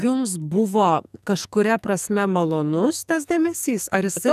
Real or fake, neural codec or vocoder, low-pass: fake; codec, 44.1 kHz, 2.6 kbps, SNAC; 14.4 kHz